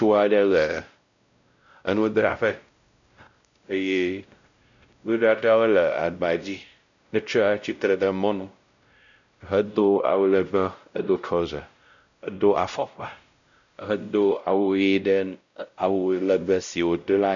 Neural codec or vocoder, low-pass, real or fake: codec, 16 kHz, 0.5 kbps, X-Codec, WavLM features, trained on Multilingual LibriSpeech; 7.2 kHz; fake